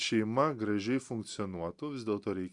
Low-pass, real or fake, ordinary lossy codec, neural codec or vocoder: 10.8 kHz; real; Opus, 64 kbps; none